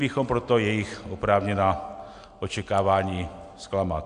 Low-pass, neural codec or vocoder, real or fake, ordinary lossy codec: 9.9 kHz; none; real; AAC, 64 kbps